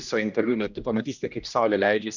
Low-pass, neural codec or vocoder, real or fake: 7.2 kHz; codec, 16 kHz, 1 kbps, X-Codec, HuBERT features, trained on general audio; fake